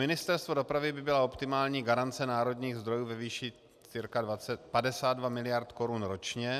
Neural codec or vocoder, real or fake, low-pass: none; real; 14.4 kHz